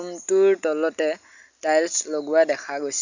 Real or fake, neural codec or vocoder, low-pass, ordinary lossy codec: real; none; 7.2 kHz; none